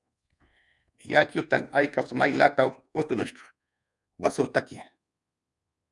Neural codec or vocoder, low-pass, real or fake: codec, 24 kHz, 1.2 kbps, DualCodec; 10.8 kHz; fake